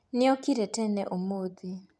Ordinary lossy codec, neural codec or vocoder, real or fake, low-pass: none; none; real; none